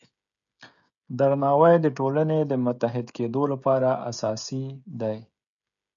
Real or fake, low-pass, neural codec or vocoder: fake; 7.2 kHz; codec, 16 kHz, 16 kbps, FreqCodec, smaller model